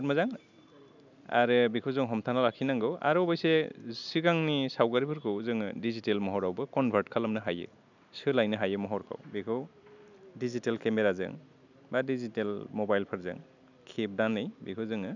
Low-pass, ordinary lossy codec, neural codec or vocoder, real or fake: 7.2 kHz; none; none; real